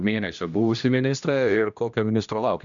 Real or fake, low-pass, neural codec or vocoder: fake; 7.2 kHz; codec, 16 kHz, 1 kbps, X-Codec, HuBERT features, trained on general audio